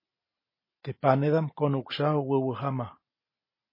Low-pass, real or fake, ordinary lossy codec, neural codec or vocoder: 5.4 kHz; real; MP3, 24 kbps; none